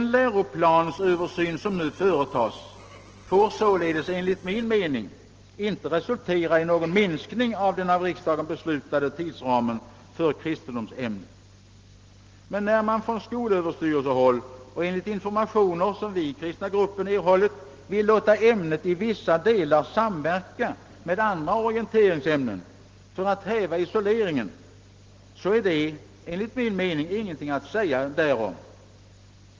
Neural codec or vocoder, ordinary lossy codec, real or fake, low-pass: none; Opus, 16 kbps; real; 7.2 kHz